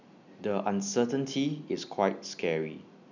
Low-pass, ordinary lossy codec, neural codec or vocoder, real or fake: 7.2 kHz; none; none; real